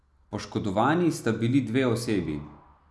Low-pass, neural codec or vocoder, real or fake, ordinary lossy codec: none; none; real; none